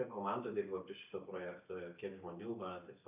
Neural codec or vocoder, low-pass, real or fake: none; 3.6 kHz; real